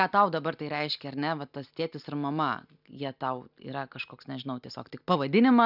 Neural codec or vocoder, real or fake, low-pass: none; real; 5.4 kHz